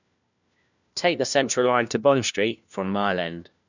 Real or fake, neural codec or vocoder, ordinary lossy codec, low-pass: fake; codec, 16 kHz, 1 kbps, FunCodec, trained on LibriTTS, 50 frames a second; none; 7.2 kHz